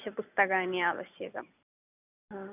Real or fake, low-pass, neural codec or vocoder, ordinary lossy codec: real; 3.6 kHz; none; none